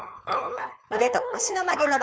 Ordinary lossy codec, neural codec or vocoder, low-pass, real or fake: none; codec, 16 kHz, 4.8 kbps, FACodec; none; fake